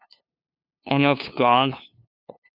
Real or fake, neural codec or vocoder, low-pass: fake; codec, 16 kHz, 2 kbps, FunCodec, trained on LibriTTS, 25 frames a second; 5.4 kHz